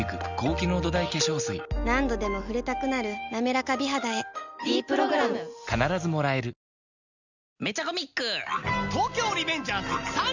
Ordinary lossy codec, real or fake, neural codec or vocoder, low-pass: none; real; none; 7.2 kHz